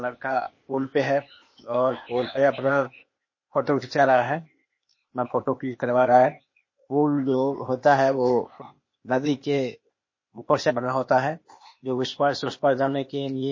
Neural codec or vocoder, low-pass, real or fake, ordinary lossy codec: codec, 16 kHz, 0.8 kbps, ZipCodec; 7.2 kHz; fake; MP3, 32 kbps